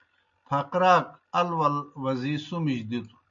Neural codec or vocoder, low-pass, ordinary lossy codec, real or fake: none; 7.2 kHz; MP3, 64 kbps; real